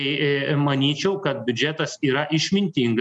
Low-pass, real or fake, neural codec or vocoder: 10.8 kHz; real; none